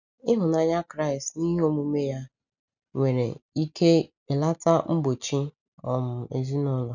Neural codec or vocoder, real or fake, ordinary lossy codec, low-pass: none; real; none; 7.2 kHz